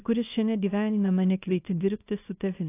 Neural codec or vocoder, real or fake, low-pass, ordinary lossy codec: codec, 24 kHz, 0.9 kbps, WavTokenizer, small release; fake; 3.6 kHz; AAC, 24 kbps